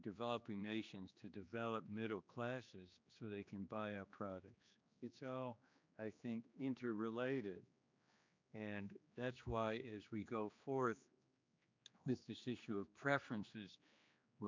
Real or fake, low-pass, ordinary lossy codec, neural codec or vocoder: fake; 7.2 kHz; MP3, 64 kbps; codec, 16 kHz, 2 kbps, X-Codec, HuBERT features, trained on general audio